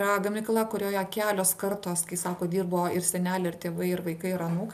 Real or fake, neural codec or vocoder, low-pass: real; none; 14.4 kHz